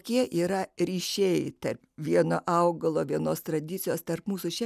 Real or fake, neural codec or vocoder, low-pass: real; none; 14.4 kHz